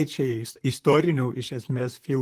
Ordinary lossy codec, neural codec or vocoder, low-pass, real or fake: Opus, 24 kbps; vocoder, 44.1 kHz, 128 mel bands, Pupu-Vocoder; 14.4 kHz; fake